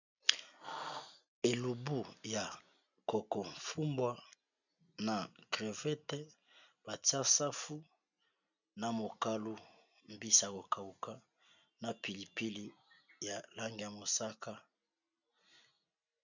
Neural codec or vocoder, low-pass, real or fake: none; 7.2 kHz; real